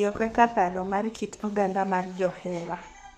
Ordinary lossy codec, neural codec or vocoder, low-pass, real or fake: none; codec, 32 kHz, 1.9 kbps, SNAC; 14.4 kHz; fake